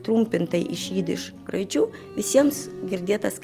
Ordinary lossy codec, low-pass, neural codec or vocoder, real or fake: Opus, 32 kbps; 14.4 kHz; vocoder, 44.1 kHz, 128 mel bands every 512 samples, BigVGAN v2; fake